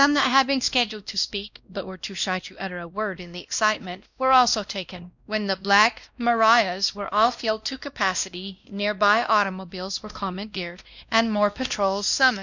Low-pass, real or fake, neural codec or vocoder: 7.2 kHz; fake; codec, 16 kHz, 1 kbps, X-Codec, WavLM features, trained on Multilingual LibriSpeech